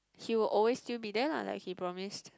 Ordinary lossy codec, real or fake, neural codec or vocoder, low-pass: none; real; none; none